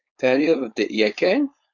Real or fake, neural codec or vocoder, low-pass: fake; codec, 16 kHz, 4.8 kbps, FACodec; 7.2 kHz